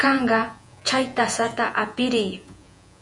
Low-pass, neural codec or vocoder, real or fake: 10.8 kHz; vocoder, 48 kHz, 128 mel bands, Vocos; fake